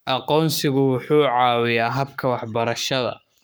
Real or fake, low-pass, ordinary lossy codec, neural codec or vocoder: fake; none; none; codec, 44.1 kHz, 7.8 kbps, Pupu-Codec